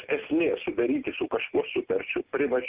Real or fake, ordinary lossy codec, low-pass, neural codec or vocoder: real; Opus, 32 kbps; 3.6 kHz; none